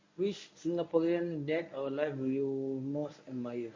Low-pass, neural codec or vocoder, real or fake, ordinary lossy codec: 7.2 kHz; codec, 24 kHz, 0.9 kbps, WavTokenizer, medium speech release version 1; fake; MP3, 32 kbps